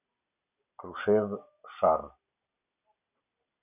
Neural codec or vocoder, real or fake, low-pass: none; real; 3.6 kHz